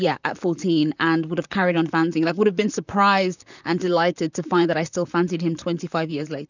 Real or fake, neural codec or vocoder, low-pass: fake; vocoder, 44.1 kHz, 128 mel bands, Pupu-Vocoder; 7.2 kHz